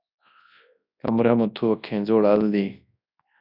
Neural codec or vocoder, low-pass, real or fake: codec, 24 kHz, 0.9 kbps, WavTokenizer, large speech release; 5.4 kHz; fake